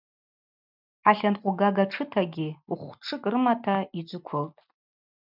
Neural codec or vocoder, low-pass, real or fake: none; 5.4 kHz; real